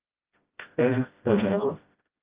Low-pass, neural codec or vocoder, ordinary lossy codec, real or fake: 3.6 kHz; codec, 16 kHz, 0.5 kbps, FreqCodec, smaller model; Opus, 24 kbps; fake